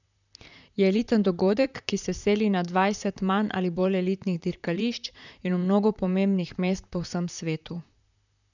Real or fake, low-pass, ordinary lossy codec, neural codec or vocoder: fake; 7.2 kHz; none; vocoder, 44.1 kHz, 128 mel bands, Pupu-Vocoder